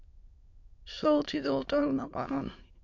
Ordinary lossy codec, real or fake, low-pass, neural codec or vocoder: MP3, 48 kbps; fake; 7.2 kHz; autoencoder, 22.05 kHz, a latent of 192 numbers a frame, VITS, trained on many speakers